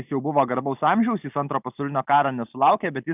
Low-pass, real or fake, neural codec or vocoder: 3.6 kHz; real; none